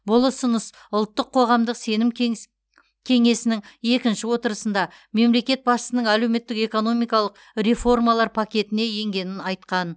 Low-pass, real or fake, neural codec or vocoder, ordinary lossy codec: none; real; none; none